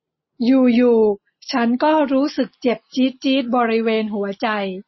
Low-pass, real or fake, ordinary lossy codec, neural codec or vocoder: 7.2 kHz; real; MP3, 24 kbps; none